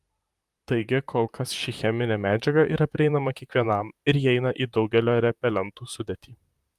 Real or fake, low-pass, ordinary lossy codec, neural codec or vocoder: fake; 14.4 kHz; Opus, 32 kbps; vocoder, 44.1 kHz, 128 mel bands, Pupu-Vocoder